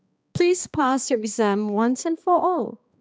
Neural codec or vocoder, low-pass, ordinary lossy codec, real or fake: codec, 16 kHz, 2 kbps, X-Codec, HuBERT features, trained on balanced general audio; none; none; fake